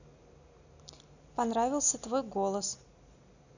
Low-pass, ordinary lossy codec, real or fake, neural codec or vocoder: 7.2 kHz; none; real; none